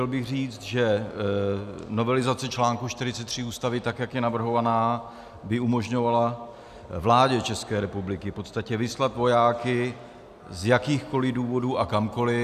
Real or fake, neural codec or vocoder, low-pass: real; none; 14.4 kHz